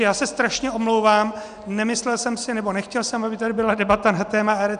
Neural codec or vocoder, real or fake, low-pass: none; real; 9.9 kHz